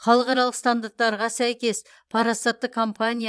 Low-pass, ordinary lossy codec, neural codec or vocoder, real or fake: none; none; vocoder, 22.05 kHz, 80 mel bands, Vocos; fake